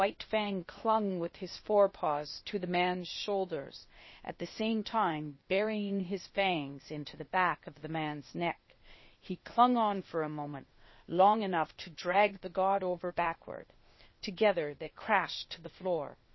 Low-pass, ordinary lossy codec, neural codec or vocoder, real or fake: 7.2 kHz; MP3, 24 kbps; codec, 16 kHz, 0.8 kbps, ZipCodec; fake